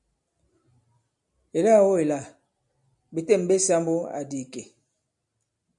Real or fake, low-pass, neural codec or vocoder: real; 10.8 kHz; none